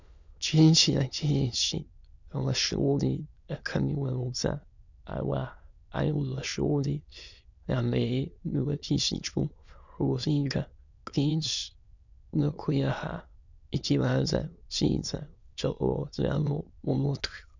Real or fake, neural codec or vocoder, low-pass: fake; autoencoder, 22.05 kHz, a latent of 192 numbers a frame, VITS, trained on many speakers; 7.2 kHz